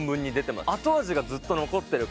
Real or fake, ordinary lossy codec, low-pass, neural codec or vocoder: real; none; none; none